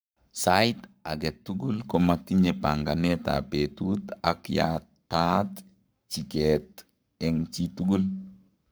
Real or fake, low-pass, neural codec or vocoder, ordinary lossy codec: fake; none; codec, 44.1 kHz, 7.8 kbps, Pupu-Codec; none